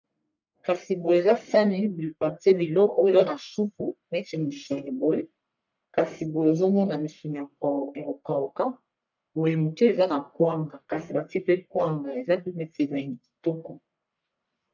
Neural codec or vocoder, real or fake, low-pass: codec, 44.1 kHz, 1.7 kbps, Pupu-Codec; fake; 7.2 kHz